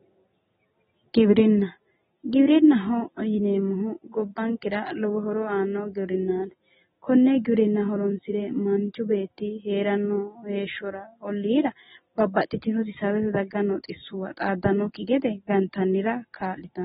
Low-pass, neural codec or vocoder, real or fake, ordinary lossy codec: 19.8 kHz; none; real; AAC, 16 kbps